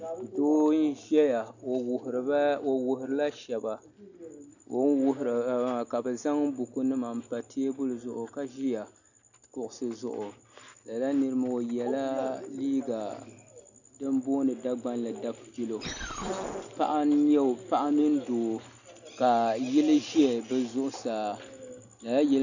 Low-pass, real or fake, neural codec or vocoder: 7.2 kHz; real; none